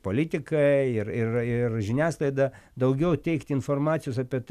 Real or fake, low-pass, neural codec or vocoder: real; 14.4 kHz; none